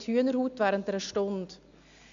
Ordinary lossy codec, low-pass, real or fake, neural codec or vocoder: AAC, 64 kbps; 7.2 kHz; real; none